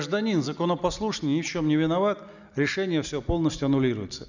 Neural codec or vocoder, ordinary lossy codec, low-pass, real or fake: none; none; 7.2 kHz; real